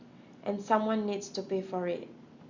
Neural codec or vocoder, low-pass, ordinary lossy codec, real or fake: none; 7.2 kHz; Opus, 64 kbps; real